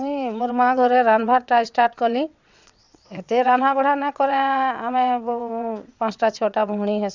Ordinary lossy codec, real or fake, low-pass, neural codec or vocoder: Opus, 64 kbps; fake; 7.2 kHz; vocoder, 22.05 kHz, 80 mel bands, WaveNeXt